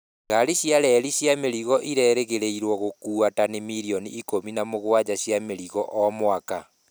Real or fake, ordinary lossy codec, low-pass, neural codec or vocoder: real; none; none; none